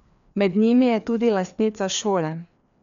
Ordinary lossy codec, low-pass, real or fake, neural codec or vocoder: none; 7.2 kHz; fake; codec, 16 kHz, 2 kbps, FreqCodec, larger model